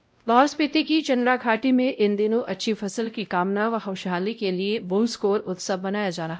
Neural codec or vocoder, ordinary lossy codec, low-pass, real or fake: codec, 16 kHz, 0.5 kbps, X-Codec, WavLM features, trained on Multilingual LibriSpeech; none; none; fake